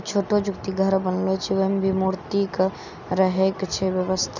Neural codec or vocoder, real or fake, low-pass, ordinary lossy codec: none; real; 7.2 kHz; none